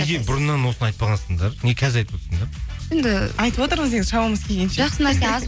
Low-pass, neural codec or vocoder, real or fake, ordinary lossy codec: none; none; real; none